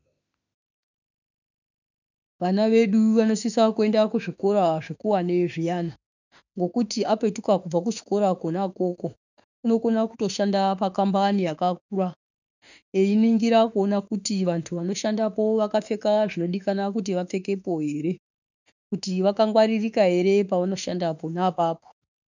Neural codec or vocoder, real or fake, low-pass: autoencoder, 48 kHz, 32 numbers a frame, DAC-VAE, trained on Japanese speech; fake; 7.2 kHz